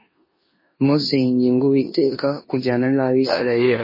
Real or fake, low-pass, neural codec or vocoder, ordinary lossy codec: fake; 5.4 kHz; codec, 16 kHz in and 24 kHz out, 0.9 kbps, LongCat-Audio-Codec, four codebook decoder; MP3, 24 kbps